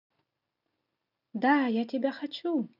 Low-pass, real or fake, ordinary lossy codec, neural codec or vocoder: 5.4 kHz; real; none; none